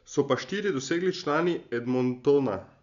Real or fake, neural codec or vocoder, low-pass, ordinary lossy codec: real; none; 7.2 kHz; none